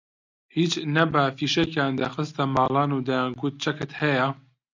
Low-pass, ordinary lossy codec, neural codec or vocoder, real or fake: 7.2 kHz; MP3, 64 kbps; none; real